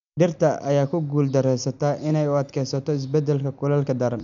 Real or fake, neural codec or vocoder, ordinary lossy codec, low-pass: real; none; none; 7.2 kHz